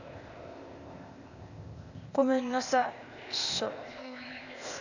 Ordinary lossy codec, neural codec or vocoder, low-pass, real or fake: none; codec, 16 kHz, 0.8 kbps, ZipCodec; 7.2 kHz; fake